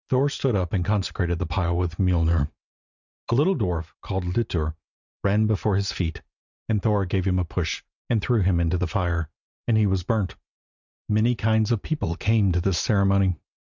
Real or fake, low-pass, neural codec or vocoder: real; 7.2 kHz; none